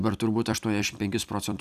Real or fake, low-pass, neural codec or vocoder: fake; 14.4 kHz; vocoder, 44.1 kHz, 128 mel bands every 256 samples, BigVGAN v2